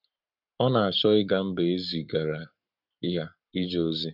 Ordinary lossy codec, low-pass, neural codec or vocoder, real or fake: none; 5.4 kHz; codec, 44.1 kHz, 7.8 kbps, Pupu-Codec; fake